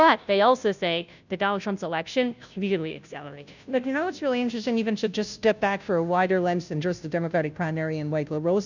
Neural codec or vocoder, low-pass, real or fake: codec, 16 kHz, 0.5 kbps, FunCodec, trained on Chinese and English, 25 frames a second; 7.2 kHz; fake